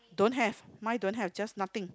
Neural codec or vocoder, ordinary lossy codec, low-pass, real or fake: none; none; none; real